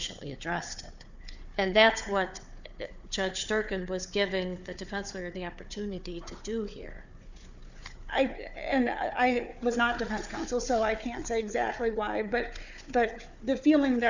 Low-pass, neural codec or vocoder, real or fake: 7.2 kHz; codec, 16 kHz, 4 kbps, FunCodec, trained on Chinese and English, 50 frames a second; fake